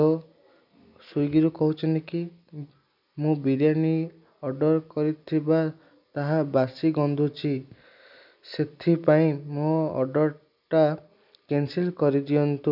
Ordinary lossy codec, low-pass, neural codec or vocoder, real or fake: none; 5.4 kHz; none; real